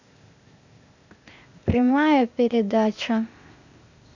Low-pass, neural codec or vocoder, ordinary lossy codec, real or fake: 7.2 kHz; codec, 16 kHz, 0.8 kbps, ZipCodec; none; fake